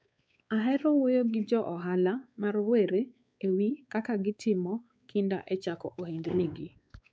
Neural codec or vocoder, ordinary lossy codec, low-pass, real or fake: codec, 16 kHz, 4 kbps, X-Codec, WavLM features, trained on Multilingual LibriSpeech; none; none; fake